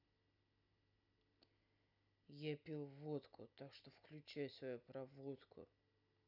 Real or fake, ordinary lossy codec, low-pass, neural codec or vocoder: real; none; 5.4 kHz; none